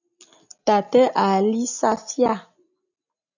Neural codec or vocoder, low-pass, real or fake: none; 7.2 kHz; real